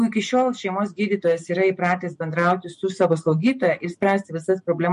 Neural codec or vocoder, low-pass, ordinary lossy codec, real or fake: none; 14.4 kHz; MP3, 48 kbps; real